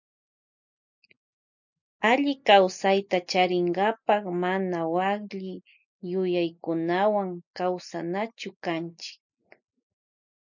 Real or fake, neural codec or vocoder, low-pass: real; none; 7.2 kHz